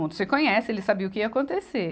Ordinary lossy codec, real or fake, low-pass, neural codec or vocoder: none; real; none; none